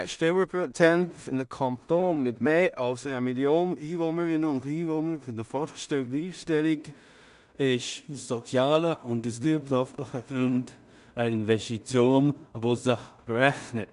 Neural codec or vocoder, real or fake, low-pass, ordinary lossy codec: codec, 16 kHz in and 24 kHz out, 0.4 kbps, LongCat-Audio-Codec, two codebook decoder; fake; 10.8 kHz; none